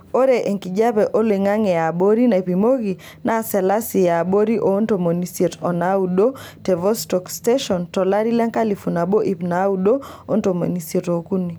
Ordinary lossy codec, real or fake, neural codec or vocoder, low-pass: none; real; none; none